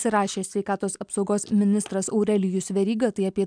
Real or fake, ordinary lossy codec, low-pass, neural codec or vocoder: real; MP3, 96 kbps; 9.9 kHz; none